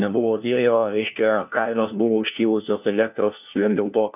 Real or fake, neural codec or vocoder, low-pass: fake; codec, 16 kHz, 1 kbps, FunCodec, trained on LibriTTS, 50 frames a second; 3.6 kHz